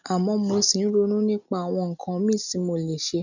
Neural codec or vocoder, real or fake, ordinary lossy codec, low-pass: none; real; none; 7.2 kHz